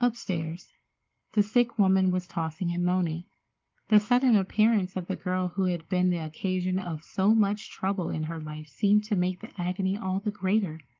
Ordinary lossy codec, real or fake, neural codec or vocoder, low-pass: Opus, 24 kbps; fake; codec, 44.1 kHz, 7.8 kbps, Pupu-Codec; 7.2 kHz